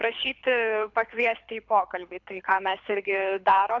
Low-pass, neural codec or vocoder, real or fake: 7.2 kHz; codec, 24 kHz, 6 kbps, HILCodec; fake